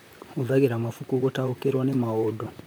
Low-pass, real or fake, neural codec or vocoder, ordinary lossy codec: none; fake; vocoder, 44.1 kHz, 128 mel bands, Pupu-Vocoder; none